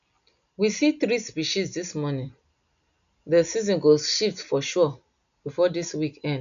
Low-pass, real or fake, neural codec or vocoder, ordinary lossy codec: 7.2 kHz; real; none; none